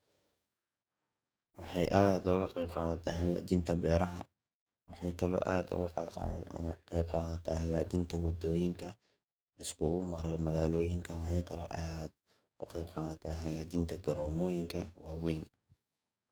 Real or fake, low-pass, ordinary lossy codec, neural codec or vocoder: fake; none; none; codec, 44.1 kHz, 2.6 kbps, DAC